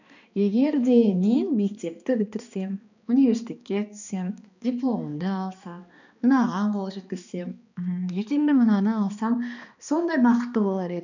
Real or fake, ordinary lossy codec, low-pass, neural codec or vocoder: fake; none; 7.2 kHz; codec, 16 kHz, 2 kbps, X-Codec, HuBERT features, trained on balanced general audio